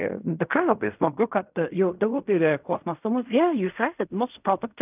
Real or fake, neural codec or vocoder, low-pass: fake; codec, 16 kHz in and 24 kHz out, 0.4 kbps, LongCat-Audio-Codec, fine tuned four codebook decoder; 3.6 kHz